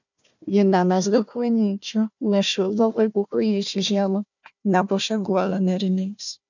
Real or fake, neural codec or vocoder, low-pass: fake; codec, 16 kHz, 1 kbps, FunCodec, trained on Chinese and English, 50 frames a second; 7.2 kHz